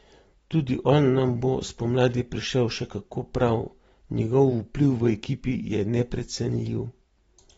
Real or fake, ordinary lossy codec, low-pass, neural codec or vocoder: fake; AAC, 24 kbps; 19.8 kHz; vocoder, 44.1 kHz, 128 mel bands every 512 samples, BigVGAN v2